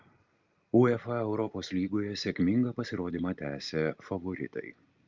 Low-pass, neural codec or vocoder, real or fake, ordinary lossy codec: 7.2 kHz; none; real; Opus, 24 kbps